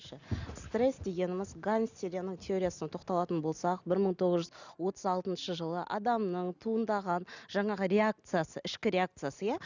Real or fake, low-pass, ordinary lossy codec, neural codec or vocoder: real; 7.2 kHz; none; none